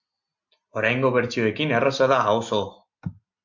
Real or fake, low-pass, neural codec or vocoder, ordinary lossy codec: real; 7.2 kHz; none; MP3, 48 kbps